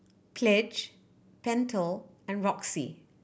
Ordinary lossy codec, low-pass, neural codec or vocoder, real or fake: none; none; none; real